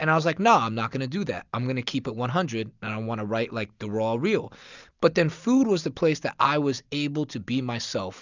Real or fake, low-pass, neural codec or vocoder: real; 7.2 kHz; none